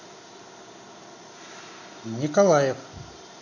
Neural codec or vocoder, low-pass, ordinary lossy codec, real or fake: none; 7.2 kHz; none; real